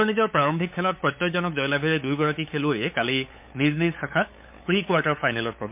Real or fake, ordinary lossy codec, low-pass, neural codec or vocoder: fake; MP3, 32 kbps; 3.6 kHz; codec, 16 kHz, 8 kbps, FunCodec, trained on LibriTTS, 25 frames a second